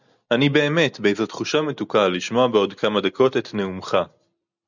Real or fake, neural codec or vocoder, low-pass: real; none; 7.2 kHz